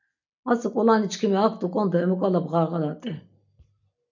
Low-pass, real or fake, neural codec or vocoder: 7.2 kHz; real; none